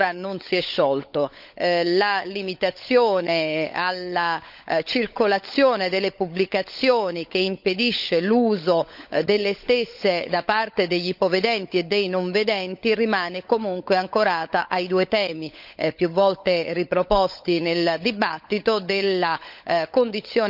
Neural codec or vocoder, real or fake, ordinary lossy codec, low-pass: codec, 16 kHz, 16 kbps, FunCodec, trained on LibriTTS, 50 frames a second; fake; none; 5.4 kHz